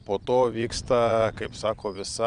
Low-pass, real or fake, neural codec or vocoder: 9.9 kHz; fake; vocoder, 22.05 kHz, 80 mel bands, Vocos